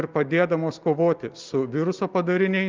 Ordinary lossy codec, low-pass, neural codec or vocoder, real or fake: Opus, 32 kbps; 7.2 kHz; none; real